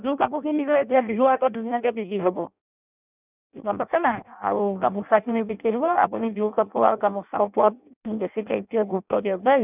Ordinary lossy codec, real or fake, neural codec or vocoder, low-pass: none; fake; codec, 16 kHz in and 24 kHz out, 0.6 kbps, FireRedTTS-2 codec; 3.6 kHz